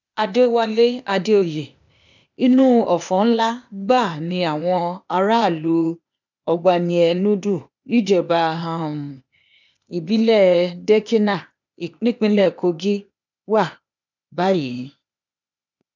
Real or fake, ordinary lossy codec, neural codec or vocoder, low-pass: fake; none; codec, 16 kHz, 0.8 kbps, ZipCodec; 7.2 kHz